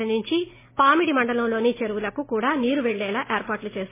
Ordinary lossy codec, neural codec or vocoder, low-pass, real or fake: MP3, 16 kbps; none; 3.6 kHz; real